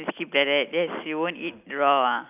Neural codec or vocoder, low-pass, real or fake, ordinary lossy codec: none; 3.6 kHz; real; none